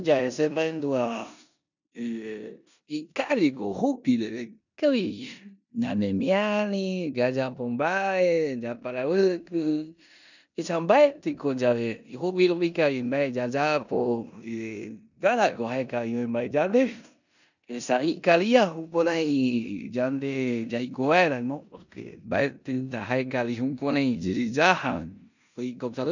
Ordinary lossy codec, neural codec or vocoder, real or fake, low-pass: none; codec, 16 kHz in and 24 kHz out, 0.9 kbps, LongCat-Audio-Codec, four codebook decoder; fake; 7.2 kHz